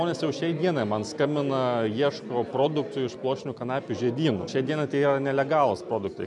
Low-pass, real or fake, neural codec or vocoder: 10.8 kHz; real; none